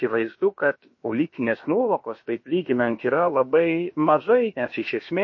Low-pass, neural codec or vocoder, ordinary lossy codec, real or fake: 7.2 kHz; codec, 16 kHz, about 1 kbps, DyCAST, with the encoder's durations; MP3, 32 kbps; fake